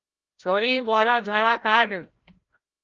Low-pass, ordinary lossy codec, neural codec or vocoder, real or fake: 7.2 kHz; Opus, 32 kbps; codec, 16 kHz, 0.5 kbps, FreqCodec, larger model; fake